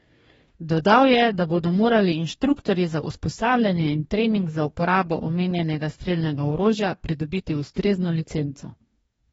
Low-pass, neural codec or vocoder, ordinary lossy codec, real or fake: 19.8 kHz; codec, 44.1 kHz, 2.6 kbps, DAC; AAC, 24 kbps; fake